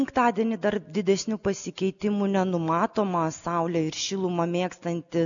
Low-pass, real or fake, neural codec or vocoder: 7.2 kHz; real; none